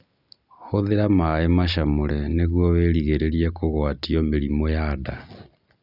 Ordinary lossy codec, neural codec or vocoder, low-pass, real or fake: none; none; 5.4 kHz; real